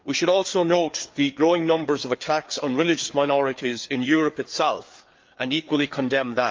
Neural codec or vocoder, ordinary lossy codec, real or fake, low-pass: codec, 16 kHz, 4 kbps, FreqCodec, larger model; Opus, 24 kbps; fake; 7.2 kHz